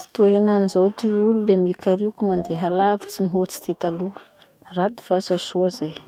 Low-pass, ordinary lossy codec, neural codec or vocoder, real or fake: 19.8 kHz; none; codec, 44.1 kHz, 2.6 kbps, DAC; fake